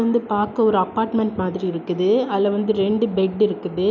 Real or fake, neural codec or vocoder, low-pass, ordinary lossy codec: real; none; 7.2 kHz; none